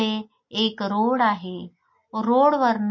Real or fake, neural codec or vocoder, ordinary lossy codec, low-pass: real; none; MP3, 32 kbps; 7.2 kHz